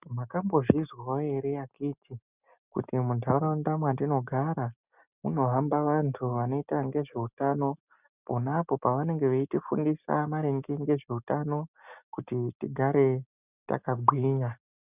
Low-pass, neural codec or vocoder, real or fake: 3.6 kHz; none; real